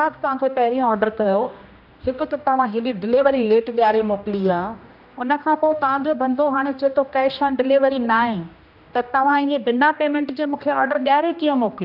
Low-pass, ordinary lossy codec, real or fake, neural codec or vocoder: 5.4 kHz; none; fake; codec, 16 kHz, 1 kbps, X-Codec, HuBERT features, trained on general audio